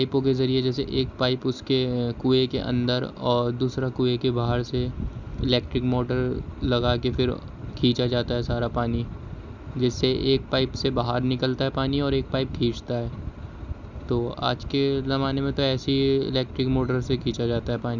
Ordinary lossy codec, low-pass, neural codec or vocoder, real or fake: none; 7.2 kHz; none; real